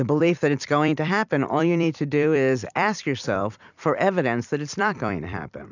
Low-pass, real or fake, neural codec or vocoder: 7.2 kHz; fake; vocoder, 44.1 kHz, 128 mel bands every 256 samples, BigVGAN v2